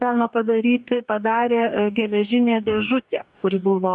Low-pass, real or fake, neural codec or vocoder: 10.8 kHz; fake; codec, 44.1 kHz, 2.6 kbps, DAC